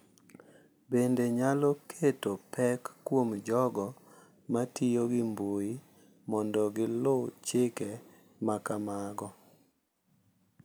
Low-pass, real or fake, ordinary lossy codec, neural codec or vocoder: none; real; none; none